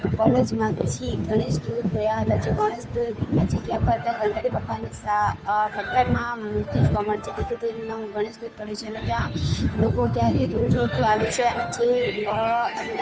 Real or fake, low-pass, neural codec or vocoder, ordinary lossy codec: fake; none; codec, 16 kHz, 2 kbps, FunCodec, trained on Chinese and English, 25 frames a second; none